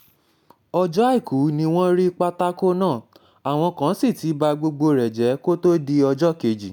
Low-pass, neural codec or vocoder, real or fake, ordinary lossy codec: none; none; real; none